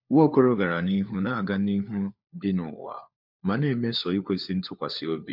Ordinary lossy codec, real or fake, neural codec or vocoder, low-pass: MP3, 48 kbps; fake; codec, 16 kHz, 4 kbps, FunCodec, trained on LibriTTS, 50 frames a second; 5.4 kHz